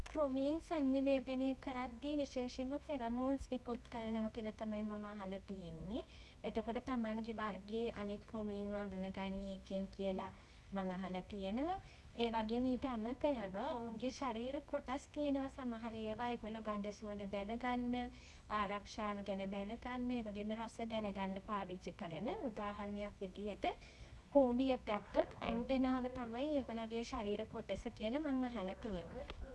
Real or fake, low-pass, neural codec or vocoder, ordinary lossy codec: fake; none; codec, 24 kHz, 0.9 kbps, WavTokenizer, medium music audio release; none